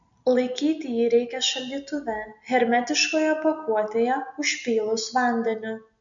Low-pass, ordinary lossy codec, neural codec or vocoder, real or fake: 7.2 kHz; MP3, 64 kbps; none; real